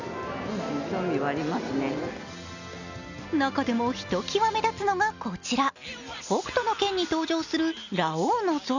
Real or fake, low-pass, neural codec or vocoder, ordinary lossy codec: real; 7.2 kHz; none; none